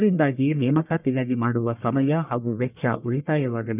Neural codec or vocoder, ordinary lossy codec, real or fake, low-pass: codec, 44.1 kHz, 1.7 kbps, Pupu-Codec; none; fake; 3.6 kHz